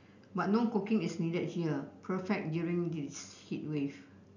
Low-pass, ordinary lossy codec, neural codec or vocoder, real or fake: 7.2 kHz; none; none; real